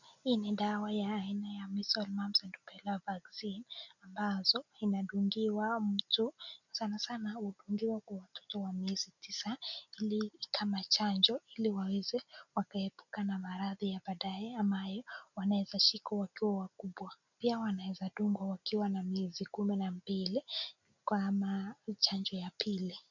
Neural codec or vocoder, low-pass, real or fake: none; 7.2 kHz; real